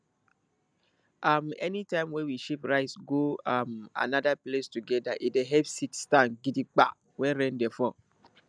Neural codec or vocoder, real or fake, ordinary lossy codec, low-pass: none; real; none; 9.9 kHz